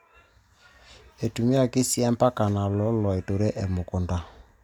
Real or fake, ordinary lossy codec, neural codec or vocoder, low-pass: real; none; none; 19.8 kHz